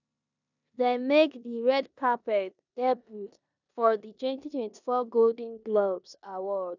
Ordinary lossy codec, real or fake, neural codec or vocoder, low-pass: none; fake; codec, 16 kHz in and 24 kHz out, 0.9 kbps, LongCat-Audio-Codec, four codebook decoder; 7.2 kHz